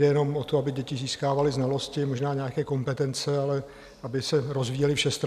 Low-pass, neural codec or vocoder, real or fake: 14.4 kHz; none; real